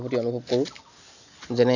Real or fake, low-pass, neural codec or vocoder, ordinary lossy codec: real; 7.2 kHz; none; none